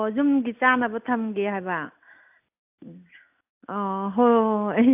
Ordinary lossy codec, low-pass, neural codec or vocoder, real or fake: AAC, 32 kbps; 3.6 kHz; none; real